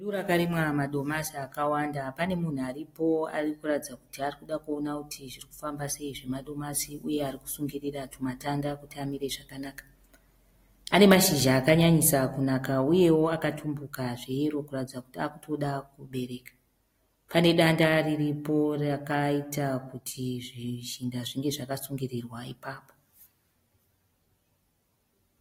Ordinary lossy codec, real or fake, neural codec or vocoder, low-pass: AAC, 48 kbps; real; none; 19.8 kHz